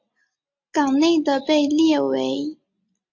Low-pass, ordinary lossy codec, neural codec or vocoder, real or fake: 7.2 kHz; MP3, 48 kbps; none; real